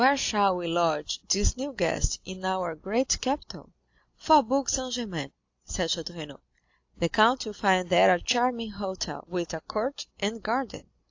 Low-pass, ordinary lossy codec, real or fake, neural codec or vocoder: 7.2 kHz; AAC, 48 kbps; real; none